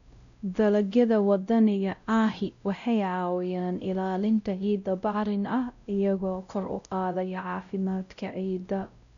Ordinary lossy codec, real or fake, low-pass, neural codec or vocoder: none; fake; 7.2 kHz; codec, 16 kHz, 0.5 kbps, X-Codec, WavLM features, trained on Multilingual LibriSpeech